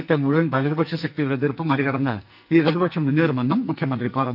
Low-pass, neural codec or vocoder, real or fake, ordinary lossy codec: 5.4 kHz; codec, 44.1 kHz, 2.6 kbps, SNAC; fake; none